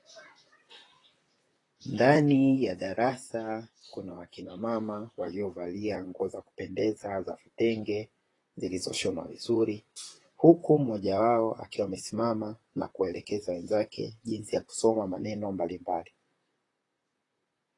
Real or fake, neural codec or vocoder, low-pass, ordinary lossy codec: fake; vocoder, 44.1 kHz, 128 mel bands, Pupu-Vocoder; 10.8 kHz; AAC, 32 kbps